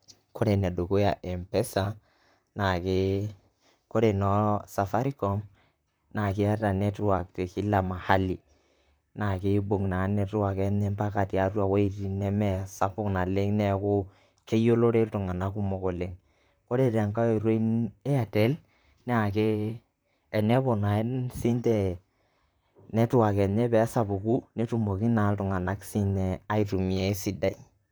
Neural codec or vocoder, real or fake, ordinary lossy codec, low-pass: vocoder, 44.1 kHz, 128 mel bands, Pupu-Vocoder; fake; none; none